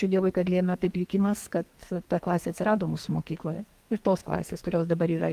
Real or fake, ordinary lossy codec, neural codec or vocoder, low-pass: fake; Opus, 32 kbps; codec, 44.1 kHz, 2.6 kbps, SNAC; 14.4 kHz